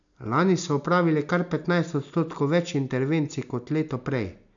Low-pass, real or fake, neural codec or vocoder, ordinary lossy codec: 7.2 kHz; real; none; none